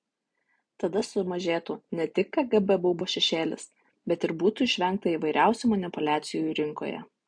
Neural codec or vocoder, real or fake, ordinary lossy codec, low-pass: none; real; AAC, 64 kbps; 9.9 kHz